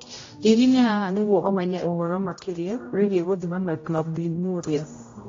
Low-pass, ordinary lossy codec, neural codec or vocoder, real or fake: 7.2 kHz; AAC, 32 kbps; codec, 16 kHz, 0.5 kbps, X-Codec, HuBERT features, trained on general audio; fake